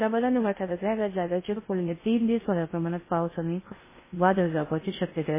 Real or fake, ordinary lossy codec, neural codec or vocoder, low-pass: fake; MP3, 16 kbps; codec, 16 kHz in and 24 kHz out, 0.6 kbps, FocalCodec, streaming, 2048 codes; 3.6 kHz